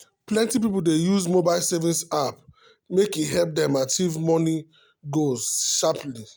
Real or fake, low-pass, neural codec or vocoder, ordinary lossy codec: real; none; none; none